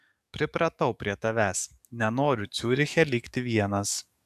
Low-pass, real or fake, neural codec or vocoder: 14.4 kHz; fake; codec, 44.1 kHz, 7.8 kbps, DAC